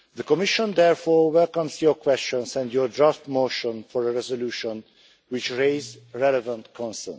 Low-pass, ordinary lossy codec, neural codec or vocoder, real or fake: none; none; none; real